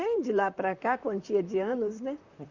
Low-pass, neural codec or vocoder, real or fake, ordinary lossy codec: 7.2 kHz; vocoder, 44.1 kHz, 128 mel bands, Pupu-Vocoder; fake; Opus, 64 kbps